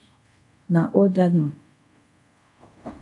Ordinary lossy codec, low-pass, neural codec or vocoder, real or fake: AAC, 64 kbps; 10.8 kHz; codec, 24 kHz, 0.5 kbps, DualCodec; fake